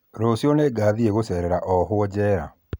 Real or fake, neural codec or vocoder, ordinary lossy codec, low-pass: real; none; none; none